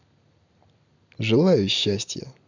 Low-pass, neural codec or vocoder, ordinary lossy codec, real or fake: 7.2 kHz; none; none; real